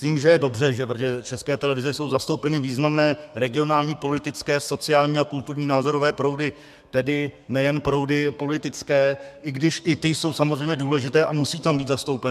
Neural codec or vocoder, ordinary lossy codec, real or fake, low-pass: codec, 32 kHz, 1.9 kbps, SNAC; MP3, 96 kbps; fake; 14.4 kHz